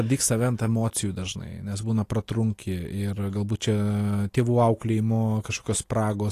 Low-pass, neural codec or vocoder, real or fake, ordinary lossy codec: 14.4 kHz; none; real; AAC, 48 kbps